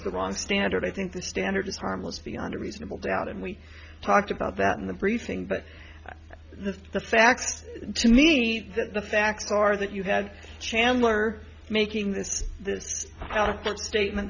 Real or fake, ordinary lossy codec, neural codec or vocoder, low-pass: real; Opus, 64 kbps; none; 7.2 kHz